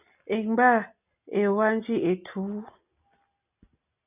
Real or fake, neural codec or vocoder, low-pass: real; none; 3.6 kHz